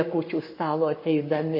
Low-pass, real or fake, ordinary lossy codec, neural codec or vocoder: 5.4 kHz; fake; MP3, 24 kbps; codec, 44.1 kHz, 7.8 kbps, Pupu-Codec